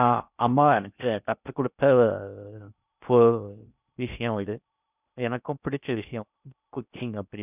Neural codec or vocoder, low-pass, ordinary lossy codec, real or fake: codec, 16 kHz in and 24 kHz out, 0.6 kbps, FocalCodec, streaming, 4096 codes; 3.6 kHz; none; fake